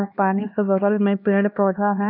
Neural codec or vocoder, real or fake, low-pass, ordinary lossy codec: codec, 16 kHz, 2 kbps, X-Codec, HuBERT features, trained on LibriSpeech; fake; 5.4 kHz; none